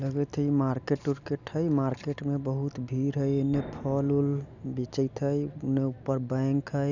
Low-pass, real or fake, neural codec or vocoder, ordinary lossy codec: 7.2 kHz; real; none; none